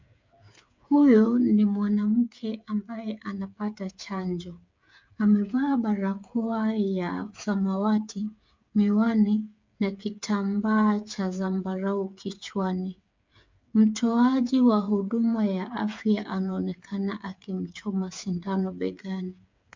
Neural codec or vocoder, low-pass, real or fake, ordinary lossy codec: codec, 16 kHz, 8 kbps, FreqCodec, smaller model; 7.2 kHz; fake; MP3, 64 kbps